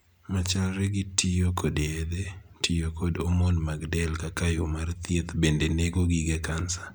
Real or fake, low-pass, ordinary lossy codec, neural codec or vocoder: real; none; none; none